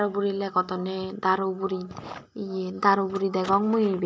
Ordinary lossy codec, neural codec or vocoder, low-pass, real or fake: none; none; none; real